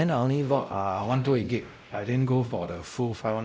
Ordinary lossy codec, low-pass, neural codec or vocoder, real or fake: none; none; codec, 16 kHz, 0.5 kbps, X-Codec, WavLM features, trained on Multilingual LibriSpeech; fake